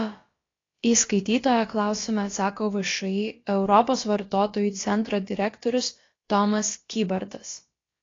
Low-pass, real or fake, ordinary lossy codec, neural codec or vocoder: 7.2 kHz; fake; AAC, 32 kbps; codec, 16 kHz, about 1 kbps, DyCAST, with the encoder's durations